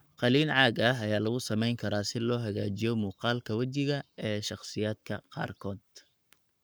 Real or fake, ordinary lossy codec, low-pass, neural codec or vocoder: fake; none; none; codec, 44.1 kHz, 7.8 kbps, Pupu-Codec